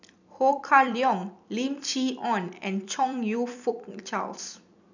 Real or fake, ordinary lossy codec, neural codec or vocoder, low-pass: fake; none; vocoder, 44.1 kHz, 128 mel bands every 256 samples, BigVGAN v2; 7.2 kHz